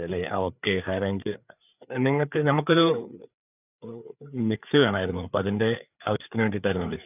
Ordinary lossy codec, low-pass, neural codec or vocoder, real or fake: none; 3.6 kHz; codec, 16 kHz, 4 kbps, FreqCodec, larger model; fake